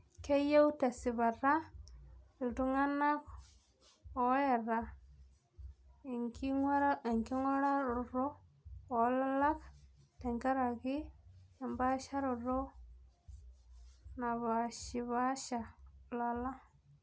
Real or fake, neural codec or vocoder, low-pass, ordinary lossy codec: real; none; none; none